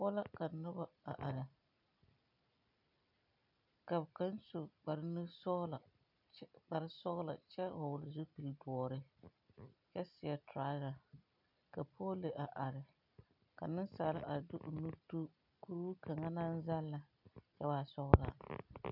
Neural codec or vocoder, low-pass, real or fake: none; 5.4 kHz; real